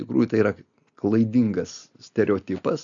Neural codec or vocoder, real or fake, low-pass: none; real; 7.2 kHz